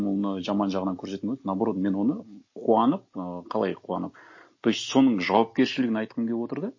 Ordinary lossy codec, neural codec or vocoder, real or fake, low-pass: MP3, 32 kbps; none; real; 7.2 kHz